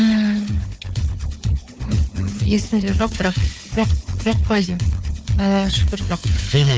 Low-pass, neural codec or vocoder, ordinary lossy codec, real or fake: none; codec, 16 kHz, 4 kbps, FunCodec, trained on Chinese and English, 50 frames a second; none; fake